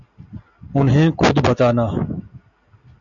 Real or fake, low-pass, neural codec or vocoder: real; 7.2 kHz; none